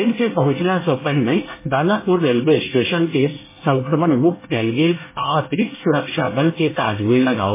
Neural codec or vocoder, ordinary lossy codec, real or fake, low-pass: codec, 24 kHz, 1 kbps, SNAC; MP3, 16 kbps; fake; 3.6 kHz